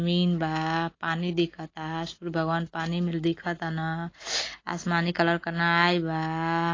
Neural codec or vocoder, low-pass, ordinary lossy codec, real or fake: none; 7.2 kHz; AAC, 32 kbps; real